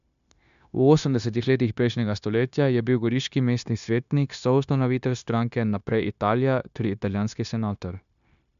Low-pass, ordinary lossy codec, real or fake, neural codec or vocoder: 7.2 kHz; MP3, 96 kbps; fake; codec, 16 kHz, 0.9 kbps, LongCat-Audio-Codec